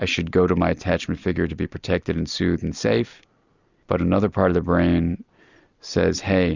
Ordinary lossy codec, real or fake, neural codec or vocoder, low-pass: Opus, 64 kbps; real; none; 7.2 kHz